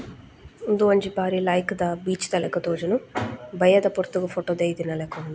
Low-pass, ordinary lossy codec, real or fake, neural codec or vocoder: none; none; real; none